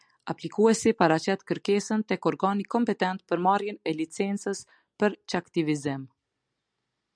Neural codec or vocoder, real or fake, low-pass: none; real; 9.9 kHz